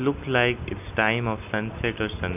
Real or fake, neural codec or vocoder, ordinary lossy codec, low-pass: real; none; none; 3.6 kHz